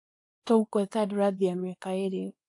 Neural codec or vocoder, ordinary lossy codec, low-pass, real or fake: codec, 24 kHz, 0.9 kbps, WavTokenizer, small release; AAC, 48 kbps; 10.8 kHz; fake